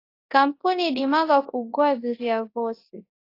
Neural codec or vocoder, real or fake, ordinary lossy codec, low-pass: codec, 24 kHz, 0.9 kbps, WavTokenizer, large speech release; fake; AAC, 32 kbps; 5.4 kHz